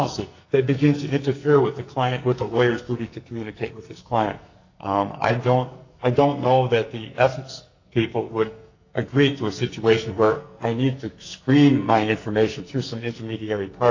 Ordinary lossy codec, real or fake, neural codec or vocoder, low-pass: AAC, 48 kbps; fake; codec, 44.1 kHz, 2.6 kbps, SNAC; 7.2 kHz